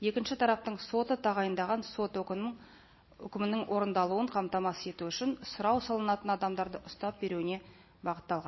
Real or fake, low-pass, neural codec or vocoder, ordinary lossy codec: real; 7.2 kHz; none; MP3, 24 kbps